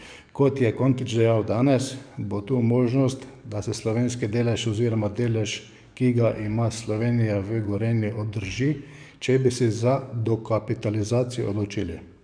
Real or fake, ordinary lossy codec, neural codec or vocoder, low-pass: fake; none; codec, 44.1 kHz, 7.8 kbps, DAC; 9.9 kHz